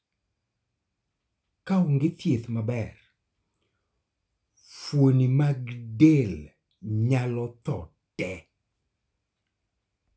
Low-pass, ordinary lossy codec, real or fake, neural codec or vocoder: none; none; real; none